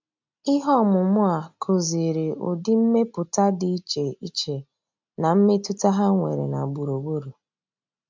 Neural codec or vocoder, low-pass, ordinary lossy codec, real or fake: none; 7.2 kHz; MP3, 64 kbps; real